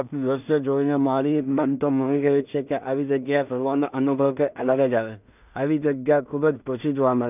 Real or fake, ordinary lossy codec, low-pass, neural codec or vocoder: fake; none; 3.6 kHz; codec, 16 kHz in and 24 kHz out, 0.4 kbps, LongCat-Audio-Codec, two codebook decoder